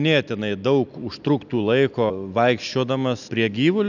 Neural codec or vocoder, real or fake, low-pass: none; real; 7.2 kHz